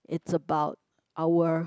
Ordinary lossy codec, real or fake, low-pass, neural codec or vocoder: none; real; none; none